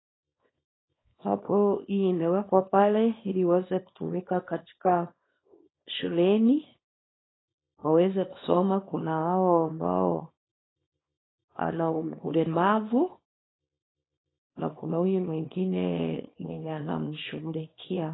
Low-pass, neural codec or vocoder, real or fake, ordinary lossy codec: 7.2 kHz; codec, 24 kHz, 0.9 kbps, WavTokenizer, small release; fake; AAC, 16 kbps